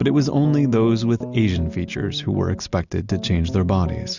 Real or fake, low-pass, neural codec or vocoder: real; 7.2 kHz; none